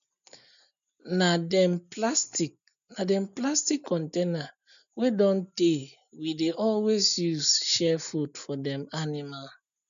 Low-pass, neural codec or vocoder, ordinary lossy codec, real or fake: 7.2 kHz; none; none; real